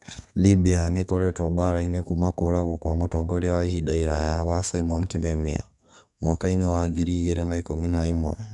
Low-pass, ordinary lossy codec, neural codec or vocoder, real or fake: 10.8 kHz; none; codec, 32 kHz, 1.9 kbps, SNAC; fake